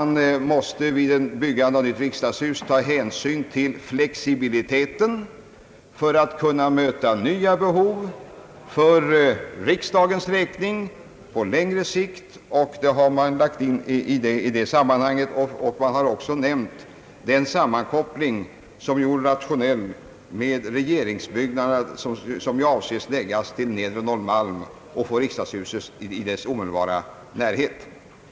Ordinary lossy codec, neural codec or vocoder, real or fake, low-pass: none; none; real; none